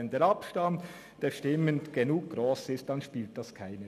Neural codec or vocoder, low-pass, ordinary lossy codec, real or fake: none; 14.4 kHz; none; real